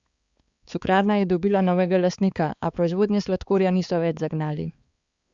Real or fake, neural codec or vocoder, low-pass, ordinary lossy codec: fake; codec, 16 kHz, 4 kbps, X-Codec, HuBERT features, trained on balanced general audio; 7.2 kHz; Opus, 64 kbps